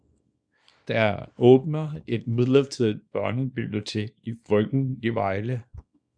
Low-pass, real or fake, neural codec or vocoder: 9.9 kHz; fake; codec, 24 kHz, 0.9 kbps, WavTokenizer, small release